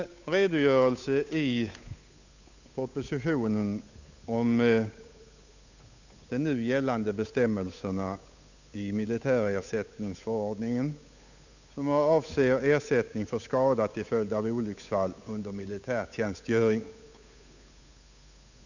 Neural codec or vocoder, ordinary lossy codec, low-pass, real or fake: codec, 16 kHz, 8 kbps, FunCodec, trained on Chinese and English, 25 frames a second; none; 7.2 kHz; fake